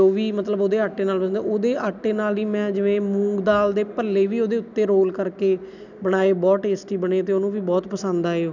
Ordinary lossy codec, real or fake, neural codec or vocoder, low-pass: none; real; none; 7.2 kHz